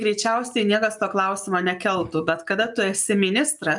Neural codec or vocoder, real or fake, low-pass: none; real; 10.8 kHz